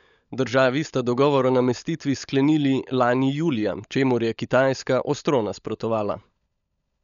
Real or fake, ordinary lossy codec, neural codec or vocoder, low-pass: fake; none; codec, 16 kHz, 16 kbps, FunCodec, trained on LibriTTS, 50 frames a second; 7.2 kHz